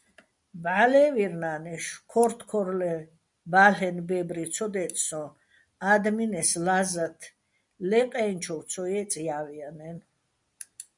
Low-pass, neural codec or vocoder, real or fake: 10.8 kHz; none; real